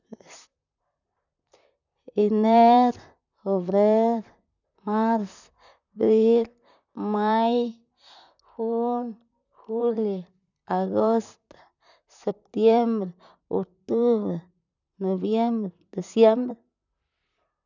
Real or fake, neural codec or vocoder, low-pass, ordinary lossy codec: fake; vocoder, 44.1 kHz, 128 mel bands, Pupu-Vocoder; 7.2 kHz; none